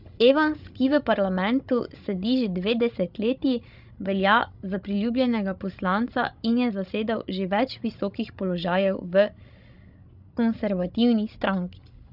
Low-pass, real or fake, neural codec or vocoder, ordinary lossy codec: 5.4 kHz; fake; codec, 16 kHz, 16 kbps, FreqCodec, larger model; none